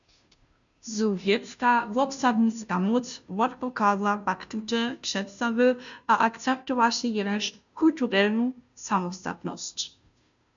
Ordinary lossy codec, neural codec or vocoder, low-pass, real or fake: MP3, 96 kbps; codec, 16 kHz, 0.5 kbps, FunCodec, trained on Chinese and English, 25 frames a second; 7.2 kHz; fake